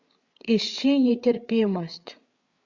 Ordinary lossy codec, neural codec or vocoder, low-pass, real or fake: Opus, 64 kbps; codec, 16 kHz, 8 kbps, FreqCodec, larger model; 7.2 kHz; fake